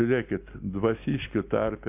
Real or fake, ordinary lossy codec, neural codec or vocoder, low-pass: real; AAC, 32 kbps; none; 3.6 kHz